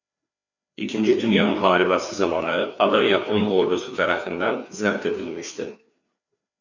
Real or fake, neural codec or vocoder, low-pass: fake; codec, 16 kHz, 2 kbps, FreqCodec, larger model; 7.2 kHz